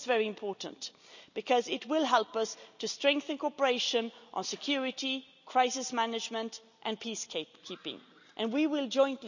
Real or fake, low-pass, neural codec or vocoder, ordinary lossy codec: real; 7.2 kHz; none; none